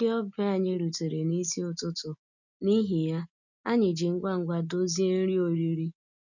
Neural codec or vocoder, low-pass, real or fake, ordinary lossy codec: none; 7.2 kHz; real; none